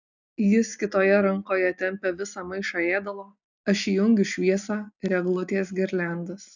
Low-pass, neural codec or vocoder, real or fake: 7.2 kHz; none; real